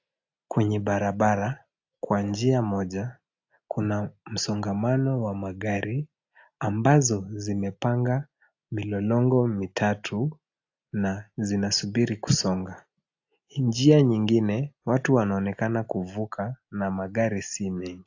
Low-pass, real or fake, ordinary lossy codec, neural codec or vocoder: 7.2 kHz; real; AAC, 48 kbps; none